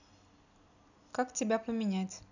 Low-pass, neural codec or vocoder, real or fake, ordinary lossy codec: 7.2 kHz; none; real; none